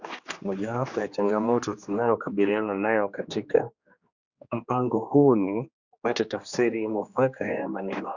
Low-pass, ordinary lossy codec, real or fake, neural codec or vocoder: 7.2 kHz; Opus, 64 kbps; fake; codec, 16 kHz, 2 kbps, X-Codec, HuBERT features, trained on general audio